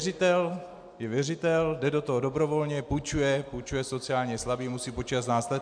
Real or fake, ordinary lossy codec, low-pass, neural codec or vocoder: real; MP3, 64 kbps; 9.9 kHz; none